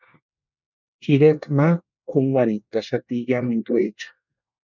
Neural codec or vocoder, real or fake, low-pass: codec, 24 kHz, 1 kbps, SNAC; fake; 7.2 kHz